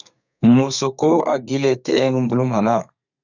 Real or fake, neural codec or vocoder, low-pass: fake; codec, 32 kHz, 1.9 kbps, SNAC; 7.2 kHz